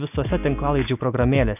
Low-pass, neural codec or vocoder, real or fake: 3.6 kHz; none; real